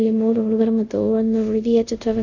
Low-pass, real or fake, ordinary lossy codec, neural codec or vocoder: 7.2 kHz; fake; none; codec, 24 kHz, 0.5 kbps, DualCodec